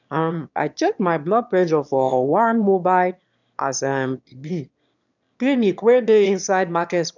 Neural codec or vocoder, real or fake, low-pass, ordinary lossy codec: autoencoder, 22.05 kHz, a latent of 192 numbers a frame, VITS, trained on one speaker; fake; 7.2 kHz; none